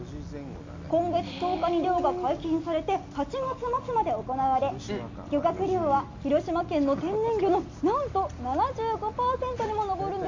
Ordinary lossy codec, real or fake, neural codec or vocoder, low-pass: MP3, 48 kbps; real; none; 7.2 kHz